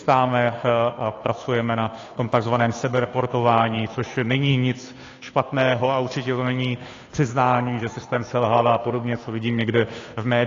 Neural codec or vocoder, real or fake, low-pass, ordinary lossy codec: codec, 16 kHz, 2 kbps, FunCodec, trained on Chinese and English, 25 frames a second; fake; 7.2 kHz; AAC, 32 kbps